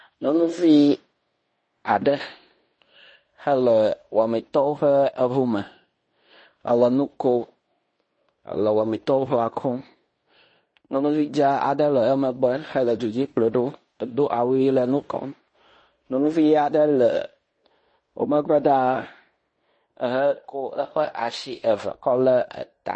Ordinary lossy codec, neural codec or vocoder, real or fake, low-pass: MP3, 32 kbps; codec, 16 kHz in and 24 kHz out, 0.9 kbps, LongCat-Audio-Codec, fine tuned four codebook decoder; fake; 9.9 kHz